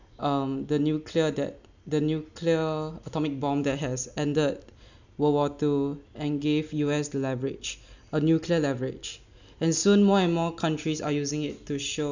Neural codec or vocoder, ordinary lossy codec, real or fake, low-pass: none; none; real; 7.2 kHz